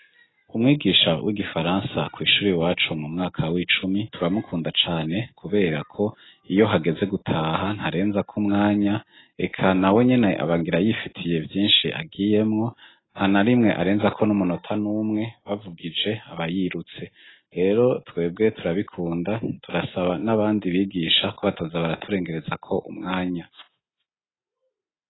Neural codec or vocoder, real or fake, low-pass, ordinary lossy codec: none; real; 7.2 kHz; AAC, 16 kbps